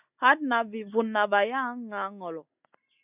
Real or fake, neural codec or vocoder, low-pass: real; none; 3.6 kHz